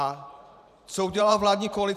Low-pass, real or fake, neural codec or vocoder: 14.4 kHz; fake; vocoder, 44.1 kHz, 128 mel bands every 512 samples, BigVGAN v2